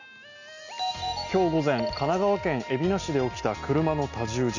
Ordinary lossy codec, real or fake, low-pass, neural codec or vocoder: none; real; 7.2 kHz; none